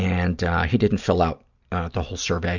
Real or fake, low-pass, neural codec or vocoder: real; 7.2 kHz; none